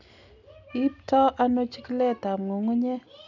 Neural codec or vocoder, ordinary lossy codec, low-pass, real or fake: none; none; 7.2 kHz; real